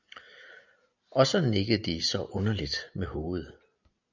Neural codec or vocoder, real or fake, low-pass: none; real; 7.2 kHz